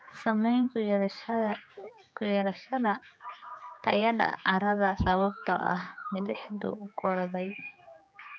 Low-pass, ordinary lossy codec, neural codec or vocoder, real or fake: none; none; codec, 16 kHz, 4 kbps, X-Codec, HuBERT features, trained on general audio; fake